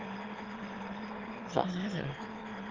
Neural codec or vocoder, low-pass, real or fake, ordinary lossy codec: autoencoder, 22.05 kHz, a latent of 192 numbers a frame, VITS, trained on one speaker; 7.2 kHz; fake; Opus, 16 kbps